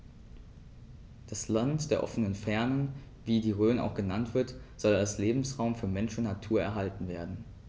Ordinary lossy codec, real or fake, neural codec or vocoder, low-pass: none; real; none; none